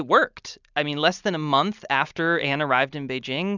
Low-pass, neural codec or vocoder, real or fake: 7.2 kHz; none; real